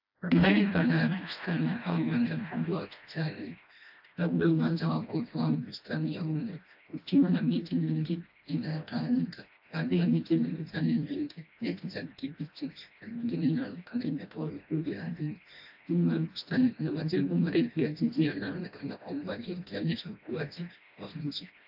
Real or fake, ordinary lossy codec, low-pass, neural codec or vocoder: fake; AAC, 48 kbps; 5.4 kHz; codec, 16 kHz, 1 kbps, FreqCodec, smaller model